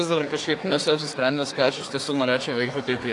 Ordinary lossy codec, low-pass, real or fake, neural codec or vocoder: AAC, 48 kbps; 10.8 kHz; fake; codec, 24 kHz, 1 kbps, SNAC